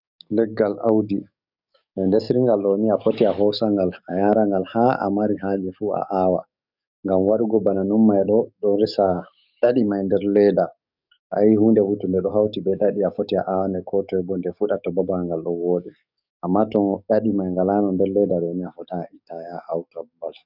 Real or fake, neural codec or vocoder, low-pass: fake; codec, 44.1 kHz, 7.8 kbps, DAC; 5.4 kHz